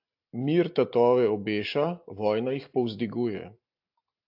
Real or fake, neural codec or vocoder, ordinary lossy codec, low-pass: real; none; AAC, 48 kbps; 5.4 kHz